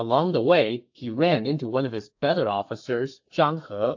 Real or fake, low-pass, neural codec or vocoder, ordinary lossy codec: fake; 7.2 kHz; codec, 32 kHz, 1.9 kbps, SNAC; AAC, 48 kbps